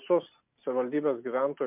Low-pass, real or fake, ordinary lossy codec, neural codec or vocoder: 3.6 kHz; real; MP3, 32 kbps; none